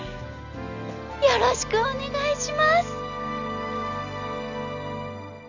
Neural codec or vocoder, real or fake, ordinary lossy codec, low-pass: none; real; none; 7.2 kHz